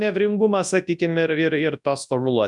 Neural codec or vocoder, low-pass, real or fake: codec, 24 kHz, 0.9 kbps, WavTokenizer, large speech release; 10.8 kHz; fake